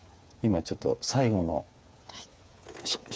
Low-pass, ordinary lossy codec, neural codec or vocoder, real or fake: none; none; codec, 16 kHz, 8 kbps, FreqCodec, smaller model; fake